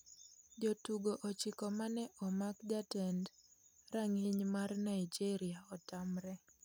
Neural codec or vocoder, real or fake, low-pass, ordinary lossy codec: none; real; none; none